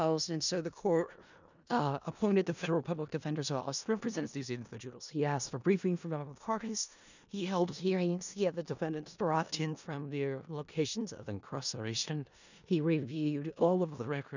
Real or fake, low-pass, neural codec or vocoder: fake; 7.2 kHz; codec, 16 kHz in and 24 kHz out, 0.4 kbps, LongCat-Audio-Codec, four codebook decoder